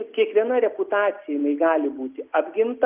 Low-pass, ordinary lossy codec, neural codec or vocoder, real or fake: 3.6 kHz; Opus, 32 kbps; none; real